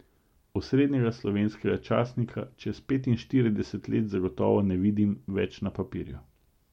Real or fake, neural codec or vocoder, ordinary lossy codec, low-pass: real; none; MP3, 64 kbps; 19.8 kHz